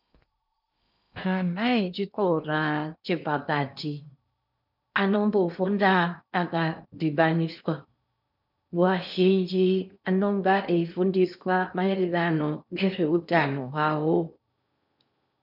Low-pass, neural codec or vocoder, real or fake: 5.4 kHz; codec, 16 kHz in and 24 kHz out, 0.8 kbps, FocalCodec, streaming, 65536 codes; fake